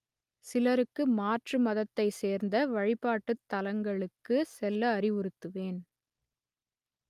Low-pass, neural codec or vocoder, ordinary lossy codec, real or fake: 14.4 kHz; none; Opus, 32 kbps; real